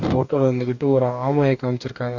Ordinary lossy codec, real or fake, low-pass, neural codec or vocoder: none; fake; 7.2 kHz; codec, 44.1 kHz, 2.6 kbps, DAC